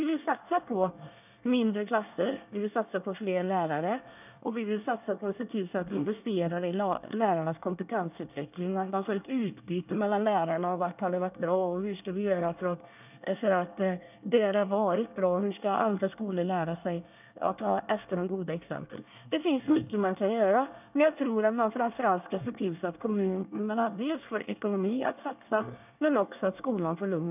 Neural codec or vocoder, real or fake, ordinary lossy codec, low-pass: codec, 24 kHz, 1 kbps, SNAC; fake; none; 3.6 kHz